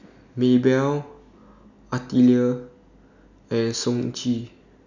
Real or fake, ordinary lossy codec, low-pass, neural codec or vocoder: real; none; 7.2 kHz; none